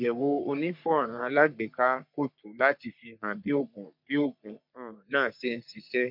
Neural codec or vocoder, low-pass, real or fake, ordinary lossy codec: codec, 44.1 kHz, 3.4 kbps, Pupu-Codec; 5.4 kHz; fake; MP3, 48 kbps